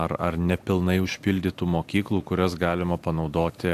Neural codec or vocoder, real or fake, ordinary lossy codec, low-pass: none; real; AAC, 64 kbps; 14.4 kHz